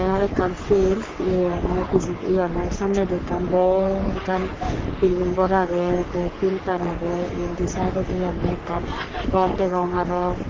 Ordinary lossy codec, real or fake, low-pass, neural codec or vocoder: Opus, 32 kbps; fake; 7.2 kHz; codec, 44.1 kHz, 3.4 kbps, Pupu-Codec